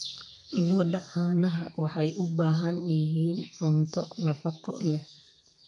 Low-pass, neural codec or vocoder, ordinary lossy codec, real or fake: 10.8 kHz; codec, 32 kHz, 1.9 kbps, SNAC; none; fake